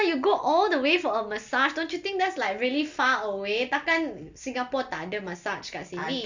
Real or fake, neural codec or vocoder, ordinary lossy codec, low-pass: real; none; Opus, 64 kbps; 7.2 kHz